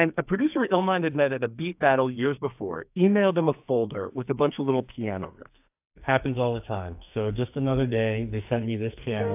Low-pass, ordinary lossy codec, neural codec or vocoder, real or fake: 3.6 kHz; AAC, 32 kbps; codec, 44.1 kHz, 2.6 kbps, SNAC; fake